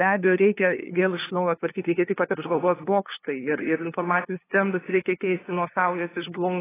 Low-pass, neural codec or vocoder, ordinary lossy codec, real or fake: 3.6 kHz; codec, 16 kHz, 2 kbps, FunCodec, trained on LibriTTS, 25 frames a second; AAC, 16 kbps; fake